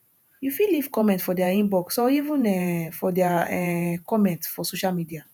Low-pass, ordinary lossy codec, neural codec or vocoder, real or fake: none; none; vocoder, 48 kHz, 128 mel bands, Vocos; fake